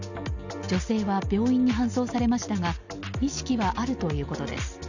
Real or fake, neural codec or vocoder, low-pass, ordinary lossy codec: real; none; 7.2 kHz; none